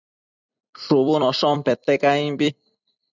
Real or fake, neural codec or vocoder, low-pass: fake; vocoder, 44.1 kHz, 128 mel bands every 256 samples, BigVGAN v2; 7.2 kHz